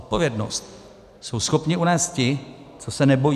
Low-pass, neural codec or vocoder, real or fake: 14.4 kHz; none; real